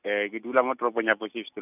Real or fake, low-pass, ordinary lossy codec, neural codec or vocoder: real; 3.6 kHz; none; none